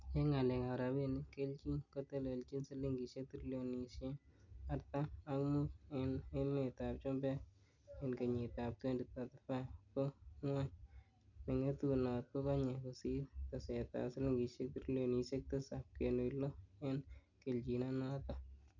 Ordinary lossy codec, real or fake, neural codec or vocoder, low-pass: none; real; none; 7.2 kHz